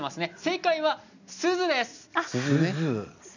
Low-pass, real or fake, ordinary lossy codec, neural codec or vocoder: 7.2 kHz; real; none; none